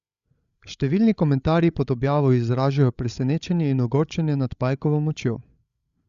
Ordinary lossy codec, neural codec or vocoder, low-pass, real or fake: Opus, 64 kbps; codec, 16 kHz, 16 kbps, FreqCodec, larger model; 7.2 kHz; fake